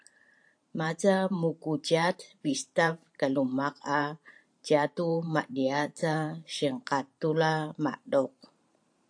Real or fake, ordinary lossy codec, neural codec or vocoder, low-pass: fake; AAC, 64 kbps; vocoder, 44.1 kHz, 128 mel bands every 512 samples, BigVGAN v2; 9.9 kHz